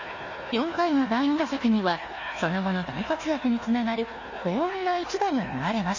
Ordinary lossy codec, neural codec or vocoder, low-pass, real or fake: MP3, 32 kbps; codec, 16 kHz, 1 kbps, FunCodec, trained on Chinese and English, 50 frames a second; 7.2 kHz; fake